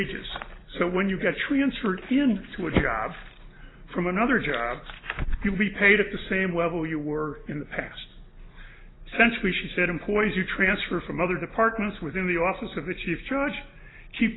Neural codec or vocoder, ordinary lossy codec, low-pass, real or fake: none; AAC, 16 kbps; 7.2 kHz; real